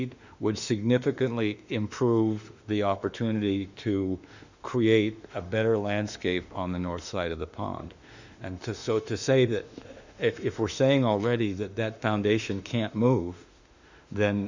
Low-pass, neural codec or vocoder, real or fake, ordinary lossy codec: 7.2 kHz; autoencoder, 48 kHz, 32 numbers a frame, DAC-VAE, trained on Japanese speech; fake; Opus, 64 kbps